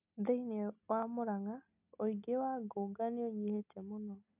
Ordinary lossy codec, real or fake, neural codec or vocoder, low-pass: none; real; none; 3.6 kHz